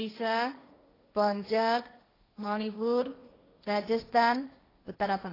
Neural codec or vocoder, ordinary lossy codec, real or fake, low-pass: codec, 16 kHz, 1.1 kbps, Voila-Tokenizer; AAC, 24 kbps; fake; 5.4 kHz